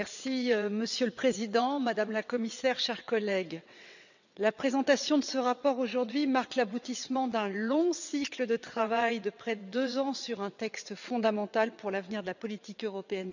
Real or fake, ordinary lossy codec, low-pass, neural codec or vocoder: fake; none; 7.2 kHz; vocoder, 22.05 kHz, 80 mel bands, WaveNeXt